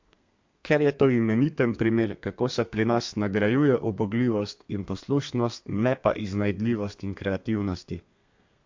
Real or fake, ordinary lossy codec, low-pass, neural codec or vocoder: fake; MP3, 48 kbps; 7.2 kHz; codec, 32 kHz, 1.9 kbps, SNAC